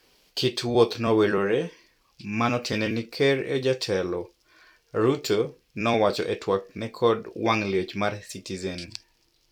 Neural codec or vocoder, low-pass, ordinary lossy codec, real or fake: vocoder, 44.1 kHz, 128 mel bands every 256 samples, BigVGAN v2; 19.8 kHz; none; fake